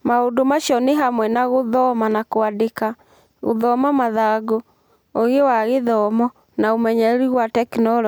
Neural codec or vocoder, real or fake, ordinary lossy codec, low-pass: none; real; none; none